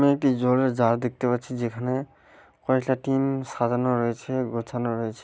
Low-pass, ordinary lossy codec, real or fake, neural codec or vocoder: none; none; real; none